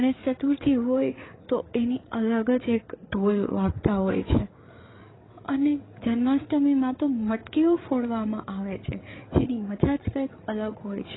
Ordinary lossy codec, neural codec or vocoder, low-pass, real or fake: AAC, 16 kbps; codec, 16 kHz, 8 kbps, FreqCodec, larger model; 7.2 kHz; fake